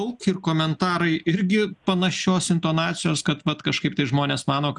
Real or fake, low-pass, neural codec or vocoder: real; 10.8 kHz; none